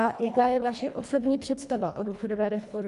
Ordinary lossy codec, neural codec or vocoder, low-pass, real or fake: MP3, 96 kbps; codec, 24 kHz, 1.5 kbps, HILCodec; 10.8 kHz; fake